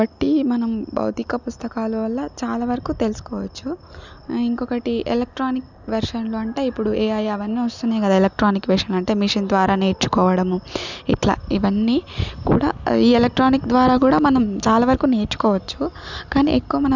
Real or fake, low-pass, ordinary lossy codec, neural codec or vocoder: real; 7.2 kHz; none; none